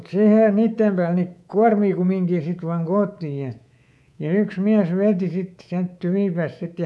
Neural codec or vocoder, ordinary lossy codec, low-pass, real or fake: codec, 24 kHz, 3.1 kbps, DualCodec; none; none; fake